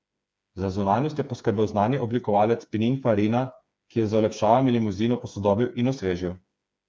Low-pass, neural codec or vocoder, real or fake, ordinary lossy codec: none; codec, 16 kHz, 4 kbps, FreqCodec, smaller model; fake; none